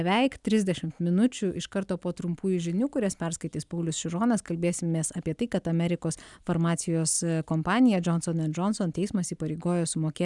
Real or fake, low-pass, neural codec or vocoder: real; 10.8 kHz; none